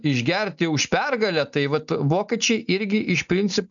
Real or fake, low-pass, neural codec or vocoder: real; 7.2 kHz; none